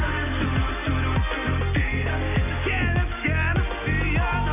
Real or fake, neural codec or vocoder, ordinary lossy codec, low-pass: real; none; none; 3.6 kHz